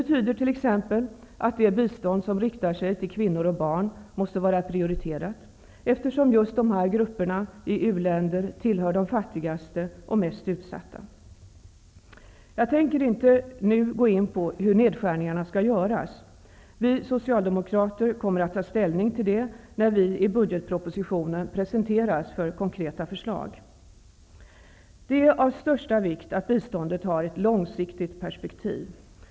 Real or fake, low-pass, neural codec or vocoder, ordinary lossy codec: real; none; none; none